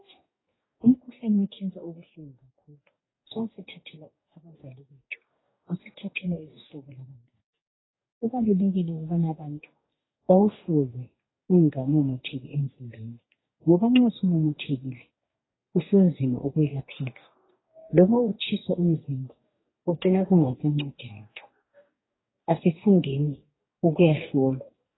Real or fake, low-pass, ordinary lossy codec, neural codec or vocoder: fake; 7.2 kHz; AAC, 16 kbps; codec, 44.1 kHz, 2.6 kbps, DAC